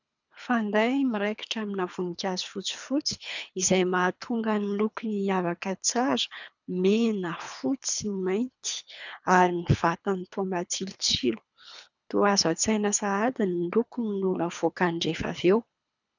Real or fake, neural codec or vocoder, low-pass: fake; codec, 24 kHz, 3 kbps, HILCodec; 7.2 kHz